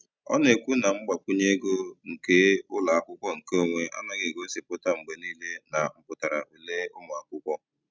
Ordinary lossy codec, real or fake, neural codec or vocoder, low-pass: none; real; none; none